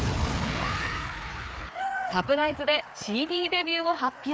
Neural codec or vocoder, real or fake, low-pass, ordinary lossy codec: codec, 16 kHz, 4 kbps, FreqCodec, larger model; fake; none; none